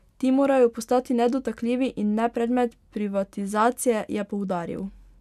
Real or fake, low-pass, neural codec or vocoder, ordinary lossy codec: real; 14.4 kHz; none; none